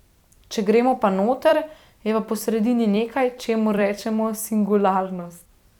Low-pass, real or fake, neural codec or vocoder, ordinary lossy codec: 19.8 kHz; fake; vocoder, 44.1 kHz, 128 mel bands every 256 samples, BigVGAN v2; none